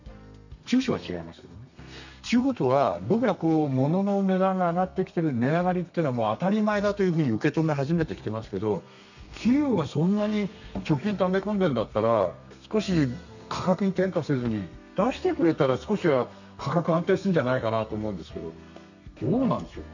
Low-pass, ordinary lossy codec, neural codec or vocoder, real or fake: 7.2 kHz; none; codec, 32 kHz, 1.9 kbps, SNAC; fake